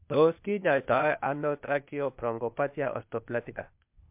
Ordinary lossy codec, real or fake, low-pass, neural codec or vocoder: MP3, 32 kbps; fake; 3.6 kHz; codec, 16 kHz, 0.8 kbps, ZipCodec